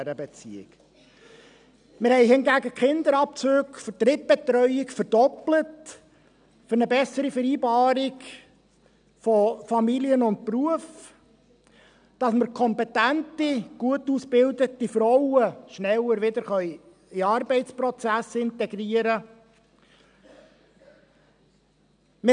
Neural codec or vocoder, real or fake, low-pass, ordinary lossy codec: none; real; 9.9 kHz; none